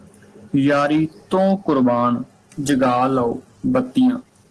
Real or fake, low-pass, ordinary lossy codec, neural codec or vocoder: real; 10.8 kHz; Opus, 16 kbps; none